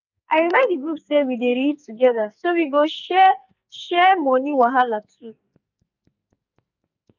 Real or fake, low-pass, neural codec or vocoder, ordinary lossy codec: fake; 7.2 kHz; codec, 44.1 kHz, 2.6 kbps, SNAC; none